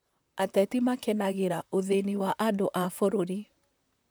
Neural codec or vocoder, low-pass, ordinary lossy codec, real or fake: vocoder, 44.1 kHz, 128 mel bands, Pupu-Vocoder; none; none; fake